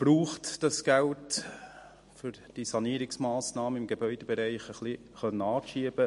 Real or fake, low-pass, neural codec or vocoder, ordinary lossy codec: real; 14.4 kHz; none; MP3, 48 kbps